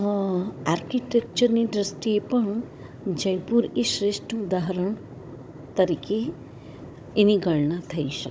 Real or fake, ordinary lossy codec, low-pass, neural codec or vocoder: fake; none; none; codec, 16 kHz, 16 kbps, FunCodec, trained on Chinese and English, 50 frames a second